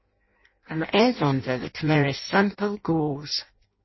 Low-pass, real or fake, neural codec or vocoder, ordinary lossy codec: 7.2 kHz; fake; codec, 16 kHz in and 24 kHz out, 0.6 kbps, FireRedTTS-2 codec; MP3, 24 kbps